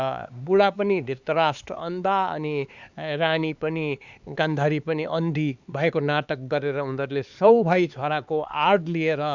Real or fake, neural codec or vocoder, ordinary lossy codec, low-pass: fake; codec, 16 kHz, 4 kbps, X-Codec, HuBERT features, trained on LibriSpeech; Opus, 64 kbps; 7.2 kHz